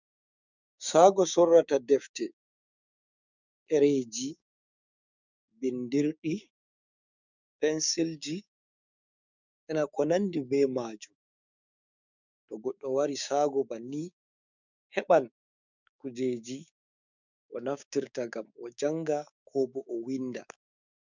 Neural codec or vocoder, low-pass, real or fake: codec, 16 kHz, 6 kbps, DAC; 7.2 kHz; fake